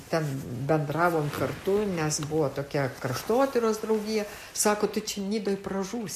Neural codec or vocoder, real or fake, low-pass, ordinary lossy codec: none; real; 14.4 kHz; MP3, 64 kbps